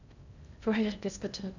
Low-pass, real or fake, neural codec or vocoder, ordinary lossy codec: 7.2 kHz; fake; codec, 16 kHz in and 24 kHz out, 0.6 kbps, FocalCodec, streaming, 2048 codes; none